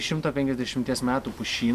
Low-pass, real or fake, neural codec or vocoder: 14.4 kHz; real; none